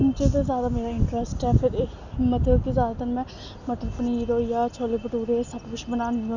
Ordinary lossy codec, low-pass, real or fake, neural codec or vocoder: none; 7.2 kHz; real; none